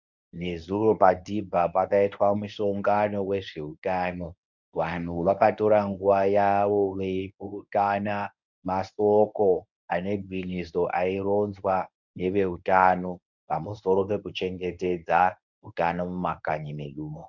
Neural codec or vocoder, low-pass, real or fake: codec, 24 kHz, 0.9 kbps, WavTokenizer, medium speech release version 1; 7.2 kHz; fake